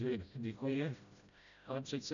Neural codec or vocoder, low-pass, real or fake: codec, 16 kHz, 0.5 kbps, FreqCodec, smaller model; 7.2 kHz; fake